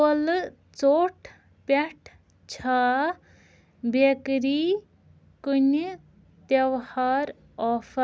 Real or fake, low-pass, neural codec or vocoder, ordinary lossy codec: real; none; none; none